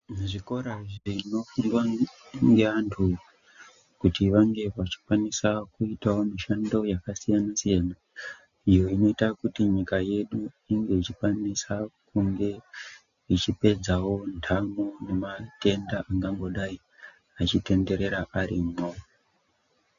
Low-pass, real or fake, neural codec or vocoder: 7.2 kHz; real; none